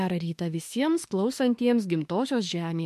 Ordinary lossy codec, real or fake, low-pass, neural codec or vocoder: MP3, 64 kbps; fake; 14.4 kHz; autoencoder, 48 kHz, 32 numbers a frame, DAC-VAE, trained on Japanese speech